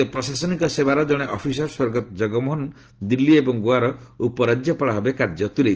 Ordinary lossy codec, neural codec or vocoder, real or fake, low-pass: Opus, 16 kbps; none; real; 7.2 kHz